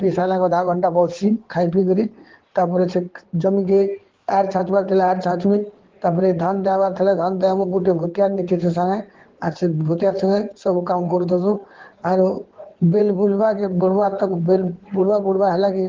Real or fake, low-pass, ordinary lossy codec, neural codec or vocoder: fake; 7.2 kHz; Opus, 16 kbps; codec, 16 kHz in and 24 kHz out, 2.2 kbps, FireRedTTS-2 codec